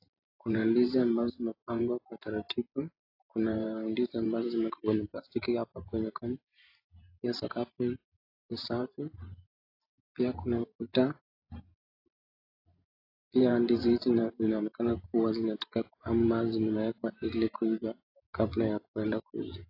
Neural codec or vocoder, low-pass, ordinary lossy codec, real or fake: vocoder, 44.1 kHz, 128 mel bands every 512 samples, BigVGAN v2; 5.4 kHz; AAC, 32 kbps; fake